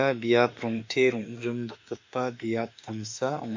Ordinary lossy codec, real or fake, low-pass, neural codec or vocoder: MP3, 48 kbps; fake; 7.2 kHz; autoencoder, 48 kHz, 32 numbers a frame, DAC-VAE, trained on Japanese speech